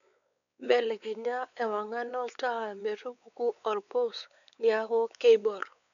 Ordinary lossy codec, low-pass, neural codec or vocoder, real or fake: none; 7.2 kHz; codec, 16 kHz, 4 kbps, X-Codec, WavLM features, trained on Multilingual LibriSpeech; fake